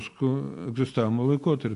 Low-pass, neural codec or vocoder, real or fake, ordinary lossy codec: 10.8 kHz; none; real; AAC, 64 kbps